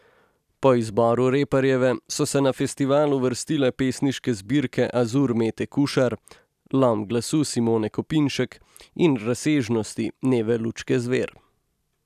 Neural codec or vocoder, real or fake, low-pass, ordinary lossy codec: none; real; 14.4 kHz; none